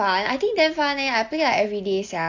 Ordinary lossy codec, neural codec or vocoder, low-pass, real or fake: AAC, 48 kbps; none; 7.2 kHz; real